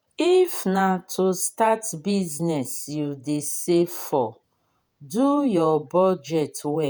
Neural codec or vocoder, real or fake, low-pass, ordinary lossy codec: vocoder, 48 kHz, 128 mel bands, Vocos; fake; none; none